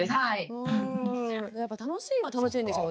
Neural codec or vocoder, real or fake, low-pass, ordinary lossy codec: codec, 16 kHz, 4 kbps, X-Codec, HuBERT features, trained on balanced general audio; fake; none; none